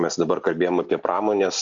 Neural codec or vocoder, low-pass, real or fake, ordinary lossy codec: none; 7.2 kHz; real; Opus, 64 kbps